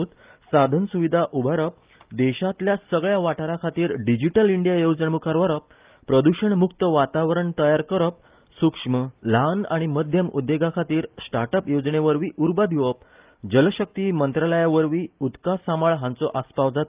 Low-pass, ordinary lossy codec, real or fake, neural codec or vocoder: 3.6 kHz; Opus, 32 kbps; real; none